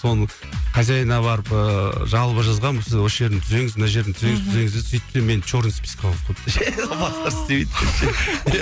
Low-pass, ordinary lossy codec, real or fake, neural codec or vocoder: none; none; real; none